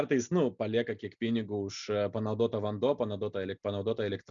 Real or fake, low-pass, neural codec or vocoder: real; 7.2 kHz; none